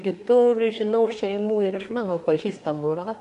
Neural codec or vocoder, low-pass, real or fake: codec, 24 kHz, 1 kbps, SNAC; 10.8 kHz; fake